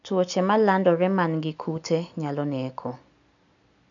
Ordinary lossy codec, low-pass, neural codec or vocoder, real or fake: none; 7.2 kHz; none; real